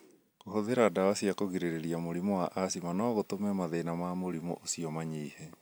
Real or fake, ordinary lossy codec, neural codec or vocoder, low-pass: real; none; none; none